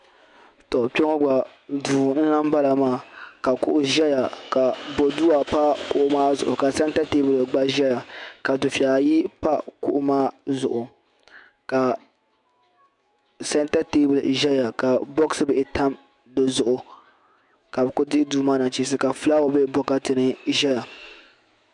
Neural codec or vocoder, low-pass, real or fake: autoencoder, 48 kHz, 128 numbers a frame, DAC-VAE, trained on Japanese speech; 10.8 kHz; fake